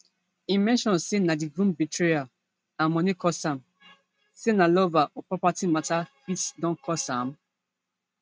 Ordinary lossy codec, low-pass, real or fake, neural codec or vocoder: none; none; real; none